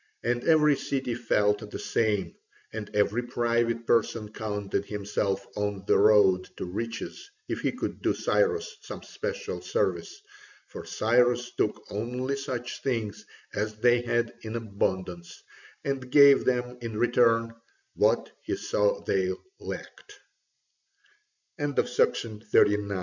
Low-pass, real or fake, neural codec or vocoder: 7.2 kHz; real; none